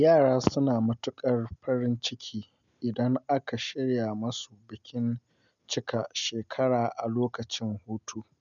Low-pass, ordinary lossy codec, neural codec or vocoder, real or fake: 7.2 kHz; none; none; real